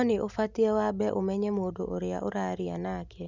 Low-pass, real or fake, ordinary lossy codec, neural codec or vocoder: 7.2 kHz; real; none; none